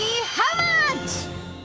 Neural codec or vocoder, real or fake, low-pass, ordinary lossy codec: codec, 16 kHz, 6 kbps, DAC; fake; none; none